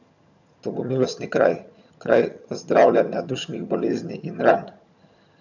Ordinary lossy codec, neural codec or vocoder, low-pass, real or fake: none; vocoder, 22.05 kHz, 80 mel bands, HiFi-GAN; 7.2 kHz; fake